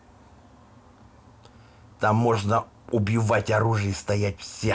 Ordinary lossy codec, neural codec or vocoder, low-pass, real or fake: none; none; none; real